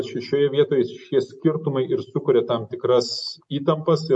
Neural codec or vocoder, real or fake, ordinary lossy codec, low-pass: none; real; MP3, 48 kbps; 10.8 kHz